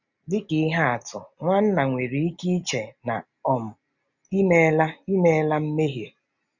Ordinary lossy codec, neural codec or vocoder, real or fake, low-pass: none; none; real; 7.2 kHz